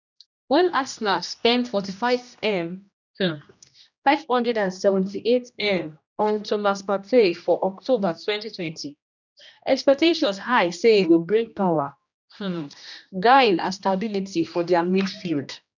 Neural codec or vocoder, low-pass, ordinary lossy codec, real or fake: codec, 16 kHz, 1 kbps, X-Codec, HuBERT features, trained on general audio; 7.2 kHz; none; fake